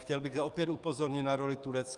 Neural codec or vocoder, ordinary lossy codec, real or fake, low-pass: codec, 44.1 kHz, 7.8 kbps, DAC; Opus, 32 kbps; fake; 10.8 kHz